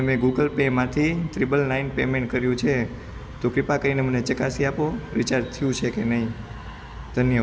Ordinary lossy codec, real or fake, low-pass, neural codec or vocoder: none; real; none; none